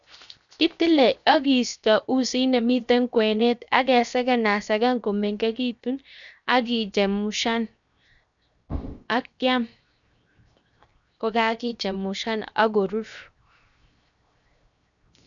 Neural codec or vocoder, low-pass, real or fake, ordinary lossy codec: codec, 16 kHz, 0.7 kbps, FocalCodec; 7.2 kHz; fake; none